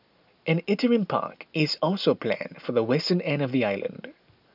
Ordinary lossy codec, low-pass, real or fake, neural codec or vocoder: none; 5.4 kHz; real; none